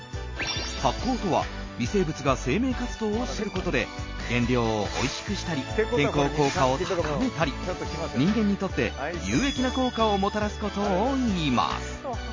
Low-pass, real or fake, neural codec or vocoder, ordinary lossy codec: 7.2 kHz; real; none; MP3, 32 kbps